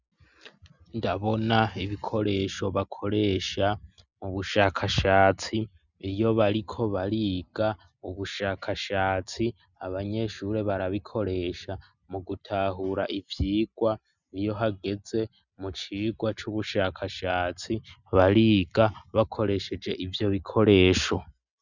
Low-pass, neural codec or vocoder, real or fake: 7.2 kHz; none; real